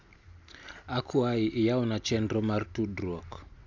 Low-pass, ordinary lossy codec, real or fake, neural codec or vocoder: 7.2 kHz; none; real; none